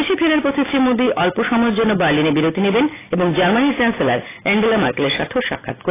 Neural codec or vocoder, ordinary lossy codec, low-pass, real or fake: none; AAC, 16 kbps; 3.6 kHz; real